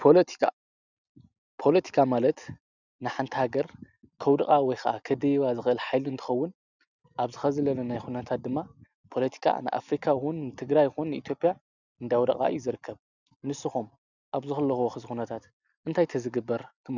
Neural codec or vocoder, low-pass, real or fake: none; 7.2 kHz; real